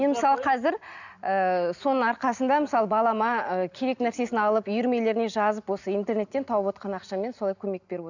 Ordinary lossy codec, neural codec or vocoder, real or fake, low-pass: none; none; real; 7.2 kHz